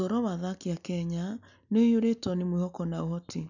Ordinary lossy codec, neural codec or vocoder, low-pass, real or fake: none; none; 7.2 kHz; real